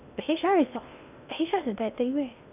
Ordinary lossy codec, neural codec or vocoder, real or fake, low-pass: none; codec, 16 kHz in and 24 kHz out, 0.6 kbps, FocalCodec, streaming, 2048 codes; fake; 3.6 kHz